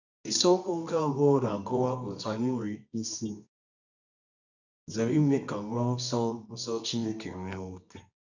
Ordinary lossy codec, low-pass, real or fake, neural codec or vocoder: none; 7.2 kHz; fake; codec, 24 kHz, 0.9 kbps, WavTokenizer, medium music audio release